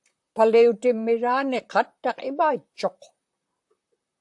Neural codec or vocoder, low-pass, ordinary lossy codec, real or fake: vocoder, 44.1 kHz, 128 mel bands, Pupu-Vocoder; 10.8 kHz; AAC, 64 kbps; fake